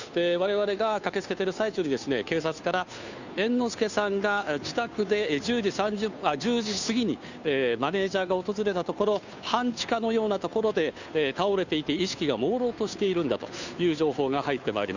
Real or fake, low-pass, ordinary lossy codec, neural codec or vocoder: fake; 7.2 kHz; none; codec, 16 kHz, 2 kbps, FunCodec, trained on Chinese and English, 25 frames a second